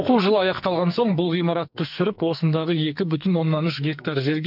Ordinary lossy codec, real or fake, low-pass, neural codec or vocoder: none; fake; 5.4 kHz; codec, 44.1 kHz, 2.6 kbps, SNAC